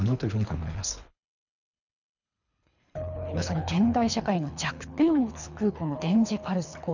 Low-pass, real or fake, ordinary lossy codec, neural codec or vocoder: 7.2 kHz; fake; none; codec, 24 kHz, 3 kbps, HILCodec